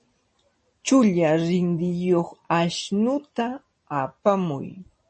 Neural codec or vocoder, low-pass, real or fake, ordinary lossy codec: none; 10.8 kHz; real; MP3, 32 kbps